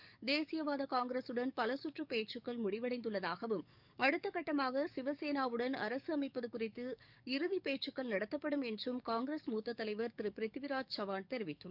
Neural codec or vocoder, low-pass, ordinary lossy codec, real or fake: codec, 44.1 kHz, 7.8 kbps, DAC; 5.4 kHz; none; fake